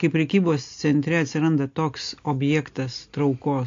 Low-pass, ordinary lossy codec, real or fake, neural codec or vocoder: 7.2 kHz; AAC, 48 kbps; real; none